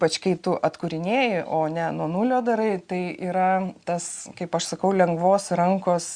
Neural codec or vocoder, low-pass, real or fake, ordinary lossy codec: none; 9.9 kHz; real; Opus, 64 kbps